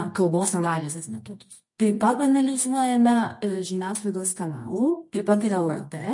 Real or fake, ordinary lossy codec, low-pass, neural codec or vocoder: fake; MP3, 48 kbps; 10.8 kHz; codec, 24 kHz, 0.9 kbps, WavTokenizer, medium music audio release